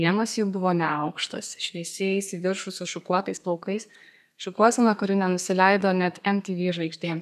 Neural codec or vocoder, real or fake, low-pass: codec, 32 kHz, 1.9 kbps, SNAC; fake; 14.4 kHz